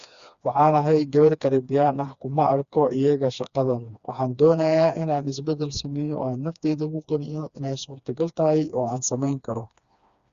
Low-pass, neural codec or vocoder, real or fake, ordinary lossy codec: 7.2 kHz; codec, 16 kHz, 2 kbps, FreqCodec, smaller model; fake; Opus, 64 kbps